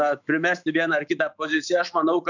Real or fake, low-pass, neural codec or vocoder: fake; 7.2 kHz; autoencoder, 48 kHz, 128 numbers a frame, DAC-VAE, trained on Japanese speech